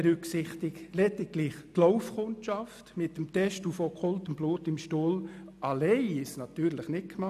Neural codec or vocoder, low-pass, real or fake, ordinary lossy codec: none; 14.4 kHz; real; none